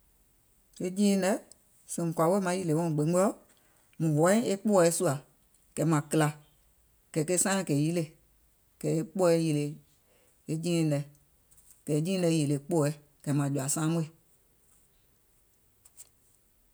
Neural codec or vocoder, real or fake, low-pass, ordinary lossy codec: none; real; none; none